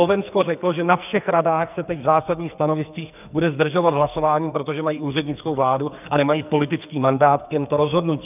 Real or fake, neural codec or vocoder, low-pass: fake; codec, 44.1 kHz, 2.6 kbps, SNAC; 3.6 kHz